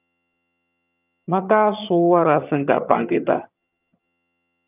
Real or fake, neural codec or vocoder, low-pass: fake; vocoder, 22.05 kHz, 80 mel bands, HiFi-GAN; 3.6 kHz